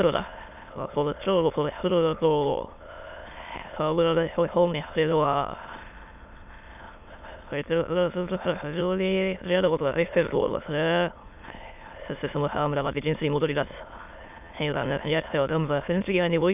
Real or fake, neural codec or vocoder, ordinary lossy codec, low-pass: fake; autoencoder, 22.05 kHz, a latent of 192 numbers a frame, VITS, trained on many speakers; none; 3.6 kHz